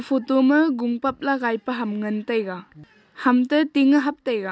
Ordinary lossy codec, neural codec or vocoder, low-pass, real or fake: none; none; none; real